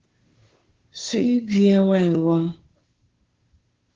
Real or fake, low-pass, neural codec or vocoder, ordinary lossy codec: fake; 7.2 kHz; codec, 16 kHz, 2 kbps, FunCodec, trained on Chinese and English, 25 frames a second; Opus, 16 kbps